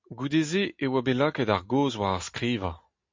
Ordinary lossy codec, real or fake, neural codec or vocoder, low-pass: MP3, 48 kbps; real; none; 7.2 kHz